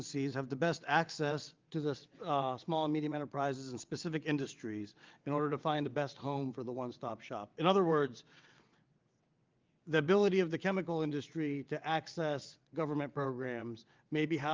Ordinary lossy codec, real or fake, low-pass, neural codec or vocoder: Opus, 32 kbps; fake; 7.2 kHz; vocoder, 22.05 kHz, 80 mel bands, WaveNeXt